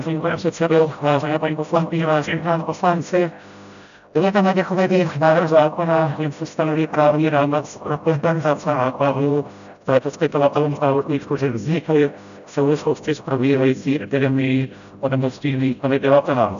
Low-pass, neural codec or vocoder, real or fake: 7.2 kHz; codec, 16 kHz, 0.5 kbps, FreqCodec, smaller model; fake